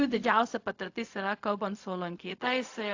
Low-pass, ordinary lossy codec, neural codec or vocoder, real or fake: 7.2 kHz; AAC, 48 kbps; codec, 16 kHz, 0.4 kbps, LongCat-Audio-Codec; fake